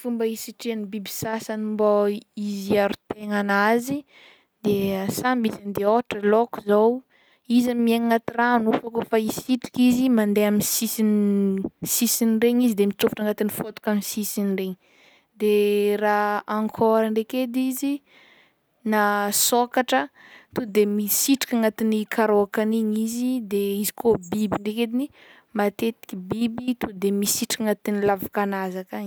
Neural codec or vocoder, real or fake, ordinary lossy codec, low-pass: none; real; none; none